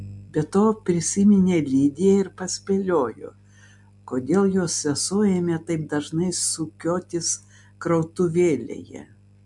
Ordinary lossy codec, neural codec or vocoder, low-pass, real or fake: MP3, 64 kbps; none; 10.8 kHz; real